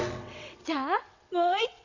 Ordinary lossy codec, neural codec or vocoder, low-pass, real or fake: none; vocoder, 22.05 kHz, 80 mel bands, WaveNeXt; 7.2 kHz; fake